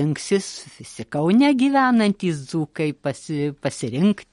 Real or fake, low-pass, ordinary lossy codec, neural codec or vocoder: real; 19.8 kHz; MP3, 48 kbps; none